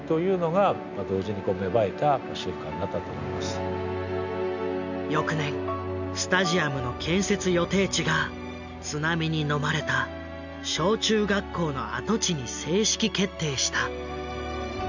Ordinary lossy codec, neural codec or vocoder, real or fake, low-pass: none; none; real; 7.2 kHz